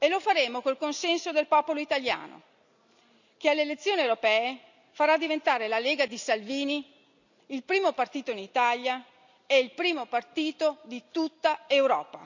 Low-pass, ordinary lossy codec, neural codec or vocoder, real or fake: 7.2 kHz; none; none; real